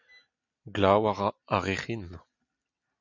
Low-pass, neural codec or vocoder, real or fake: 7.2 kHz; none; real